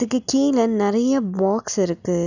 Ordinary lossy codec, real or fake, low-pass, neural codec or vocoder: none; real; 7.2 kHz; none